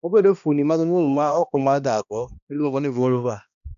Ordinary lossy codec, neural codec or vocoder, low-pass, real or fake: none; codec, 16 kHz, 1 kbps, X-Codec, HuBERT features, trained on balanced general audio; 7.2 kHz; fake